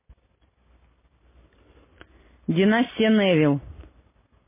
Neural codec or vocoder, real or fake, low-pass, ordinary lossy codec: none; real; 3.6 kHz; MP3, 16 kbps